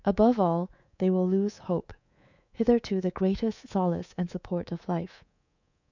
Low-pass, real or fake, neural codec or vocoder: 7.2 kHz; fake; codec, 24 kHz, 3.1 kbps, DualCodec